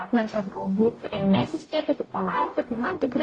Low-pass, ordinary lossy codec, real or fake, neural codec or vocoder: 10.8 kHz; AAC, 32 kbps; fake; codec, 44.1 kHz, 0.9 kbps, DAC